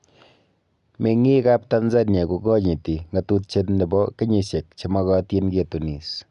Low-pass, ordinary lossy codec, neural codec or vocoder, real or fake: 10.8 kHz; none; none; real